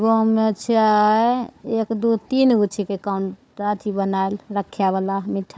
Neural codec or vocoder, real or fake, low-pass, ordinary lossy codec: codec, 16 kHz, 4 kbps, FunCodec, trained on Chinese and English, 50 frames a second; fake; none; none